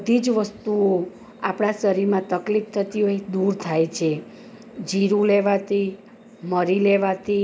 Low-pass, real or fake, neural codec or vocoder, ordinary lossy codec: none; real; none; none